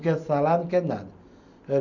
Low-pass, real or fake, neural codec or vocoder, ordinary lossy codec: 7.2 kHz; real; none; none